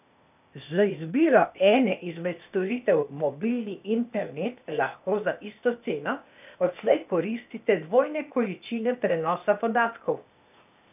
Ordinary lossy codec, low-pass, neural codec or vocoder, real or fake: none; 3.6 kHz; codec, 16 kHz, 0.8 kbps, ZipCodec; fake